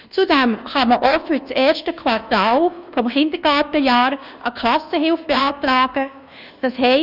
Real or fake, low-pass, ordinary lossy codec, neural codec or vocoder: fake; 5.4 kHz; none; codec, 24 kHz, 1.2 kbps, DualCodec